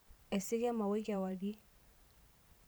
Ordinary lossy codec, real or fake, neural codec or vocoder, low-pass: none; fake; vocoder, 44.1 kHz, 128 mel bands every 512 samples, BigVGAN v2; none